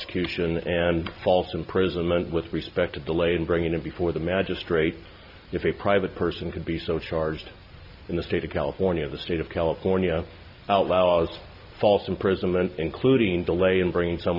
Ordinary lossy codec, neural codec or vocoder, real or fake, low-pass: AAC, 48 kbps; none; real; 5.4 kHz